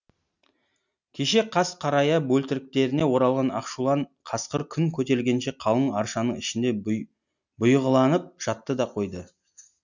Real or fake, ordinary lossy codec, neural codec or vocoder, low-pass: real; none; none; 7.2 kHz